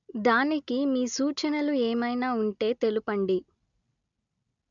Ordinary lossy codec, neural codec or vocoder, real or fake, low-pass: none; none; real; 7.2 kHz